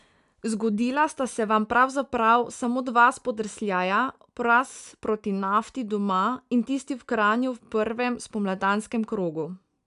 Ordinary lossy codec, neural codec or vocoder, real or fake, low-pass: none; none; real; 10.8 kHz